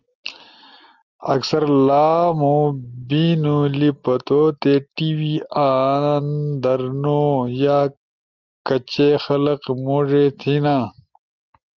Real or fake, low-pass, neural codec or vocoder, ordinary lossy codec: real; 7.2 kHz; none; Opus, 32 kbps